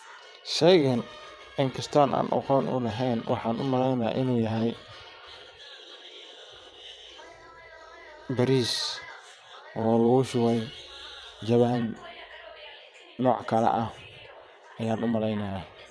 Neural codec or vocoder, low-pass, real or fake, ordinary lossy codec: vocoder, 22.05 kHz, 80 mel bands, WaveNeXt; none; fake; none